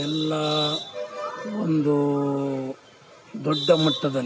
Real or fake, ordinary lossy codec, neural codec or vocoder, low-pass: real; none; none; none